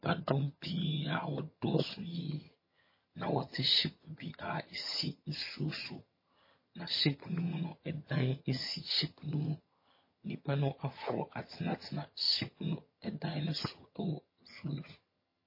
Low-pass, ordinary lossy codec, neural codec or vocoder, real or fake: 5.4 kHz; MP3, 24 kbps; vocoder, 22.05 kHz, 80 mel bands, HiFi-GAN; fake